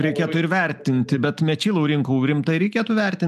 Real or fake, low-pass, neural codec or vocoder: real; 14.4 kHz; none